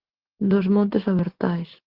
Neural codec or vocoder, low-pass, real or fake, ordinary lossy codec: none; 5.4 kHz; real; Opus, 16 kbps